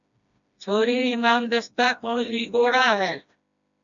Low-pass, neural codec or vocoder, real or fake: 7.2 kHz; codec, 16 kHz, 1 kbps, FreqCodec, smaller model; fake